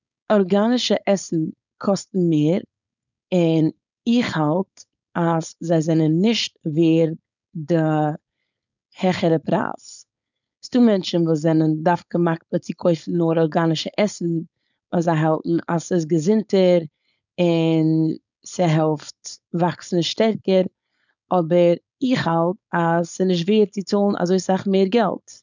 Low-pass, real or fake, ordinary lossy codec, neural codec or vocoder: 7.2 kHz; fake; none; codec, 16 kHz, 4.8 kbps, FACodec